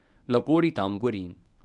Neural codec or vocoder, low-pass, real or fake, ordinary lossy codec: codec, 24 kHz, 0.9 kbps, WavTokenizer, medium speech release version 1; 10.8 kHz; fake; none